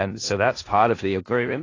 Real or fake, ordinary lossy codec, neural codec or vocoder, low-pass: fake; AAC, 32 kbps; codec, 16 kHz in and 24 kHz out, 0.4 kbps, LongCat-Audio-Codec, four codebook decoder; 7.2 kHz